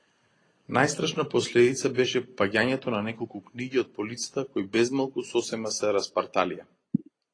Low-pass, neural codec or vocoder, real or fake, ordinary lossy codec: 9.9 kHz; none; real; AAC, 32 kbps